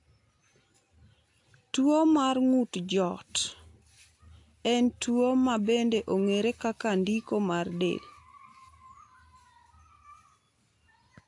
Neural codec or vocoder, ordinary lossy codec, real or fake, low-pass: none; AAC, 48 kbps; real; 10.8 kHz